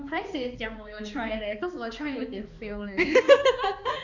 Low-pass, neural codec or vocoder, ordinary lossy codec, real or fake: 7.2 kHz; codec, 16 kHz, 2 kbps, X-Codec, HuBERT features, trained on balanced general audio; none; fake